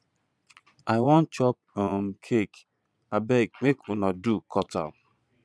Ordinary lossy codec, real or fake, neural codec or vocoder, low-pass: none; fake; vocoder, 22.05 kHz, 80 mel bands, Vocos; none